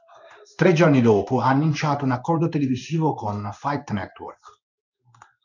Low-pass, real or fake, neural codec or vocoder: 7.2 kHz; fake; codec, 16 kHz in and 24 kHz out, 1 kbps, XY-Tokenizer